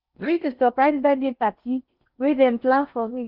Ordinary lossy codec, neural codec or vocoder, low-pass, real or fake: Opus, 24 kbps; codec, 16 kHz in and 24 kHz out, 0.6 kbps, FocalCodec, streaming, 4096 codes; 5.4 kHz; fake